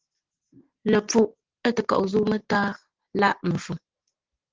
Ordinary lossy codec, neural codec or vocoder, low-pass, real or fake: Opus, 16 kbps; none; 7.2 kHz; real